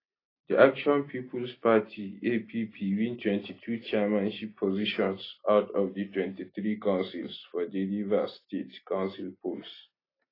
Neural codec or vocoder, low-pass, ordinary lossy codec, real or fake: none; 5.4 kHz; AAC, 24 kbps; real